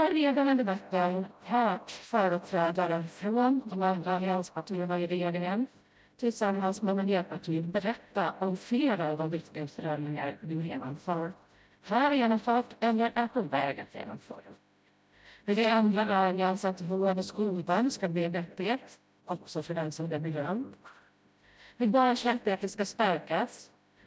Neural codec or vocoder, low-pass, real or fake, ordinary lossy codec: codec, 16 kHz, 0.5 kbps, FreqCodec, smaller model; none; fake; none